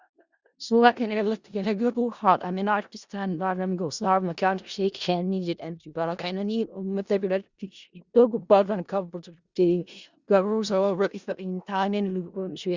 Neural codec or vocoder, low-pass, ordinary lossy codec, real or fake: codec, 16 kHz in and 24 kHz out, 0.4 kbps, LongCat-Audio-Codec, four codebook decoder; 7.2 kHz; Opus, 64 kbps; fake